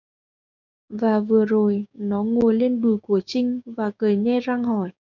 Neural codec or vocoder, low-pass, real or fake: codec, 16 kHz, 6 kbps, DAC; 7.2 kHz; fake